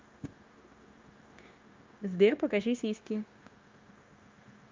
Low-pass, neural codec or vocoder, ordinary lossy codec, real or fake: 7.2 kHz; codec, 16 kHz, 0.9 kbps, LongCat-Audio-Codec; Opus, 24 kbps; fake